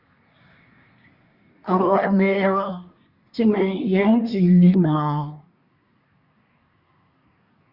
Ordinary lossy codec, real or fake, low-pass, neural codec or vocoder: Opus, 64 kbps; fake; 5.4 kHz; codec, 24 kHz, 1 kbps, SNAC